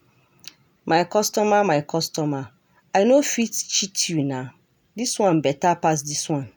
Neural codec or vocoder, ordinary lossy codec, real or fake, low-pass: none; none; real; none